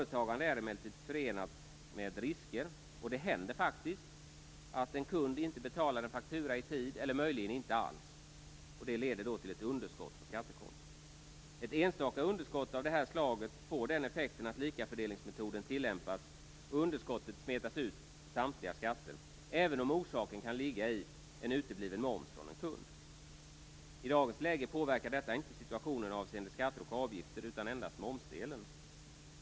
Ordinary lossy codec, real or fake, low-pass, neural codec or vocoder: none; real; none; none